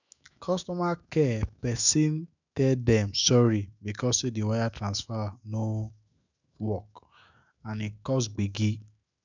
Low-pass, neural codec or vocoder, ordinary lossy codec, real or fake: 7.2 kHz; autoencoder, 48 kHz, 128 numbers a frame, DAC-VAE, trained on Japanese speech; none; fake